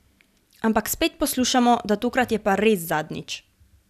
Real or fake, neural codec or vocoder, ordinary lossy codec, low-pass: real; none; none; 14.4 kHz